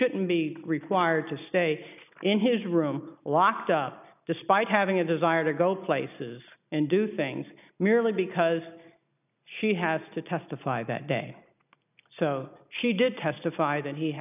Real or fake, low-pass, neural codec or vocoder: real; 3.6 kHz; none